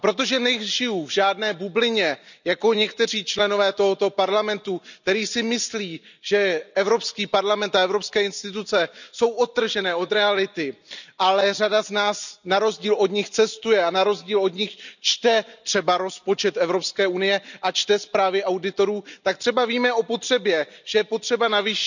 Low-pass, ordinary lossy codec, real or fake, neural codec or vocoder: 7.2 kHz; none; real; none